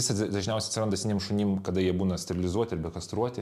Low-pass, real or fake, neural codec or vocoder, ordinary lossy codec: 14.4 kHz; real; none; MP3, 96 kbps